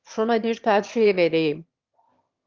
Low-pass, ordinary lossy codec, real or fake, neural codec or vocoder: 7.2 kHz; Opus, 32 kbps; fake; autoencoder, 22.05 kHz, a latent of 192 numbers a frame, VITS, trained on one speaker